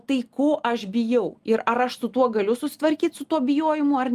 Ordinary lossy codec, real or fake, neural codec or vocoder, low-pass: Opus, 32 kbps; real; none; 14.4 kHz